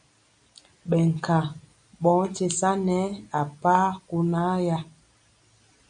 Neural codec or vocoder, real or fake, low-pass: none; real; 9.9 kHz